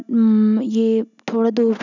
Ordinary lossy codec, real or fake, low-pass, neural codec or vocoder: none; real; 7.2 kHz; none